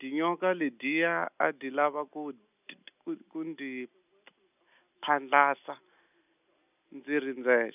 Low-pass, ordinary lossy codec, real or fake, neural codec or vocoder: 3.6 kHz; none; real; none